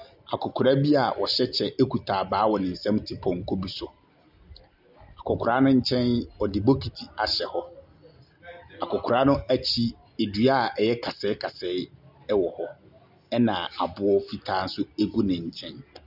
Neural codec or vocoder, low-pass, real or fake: none; 5.4 kHz; real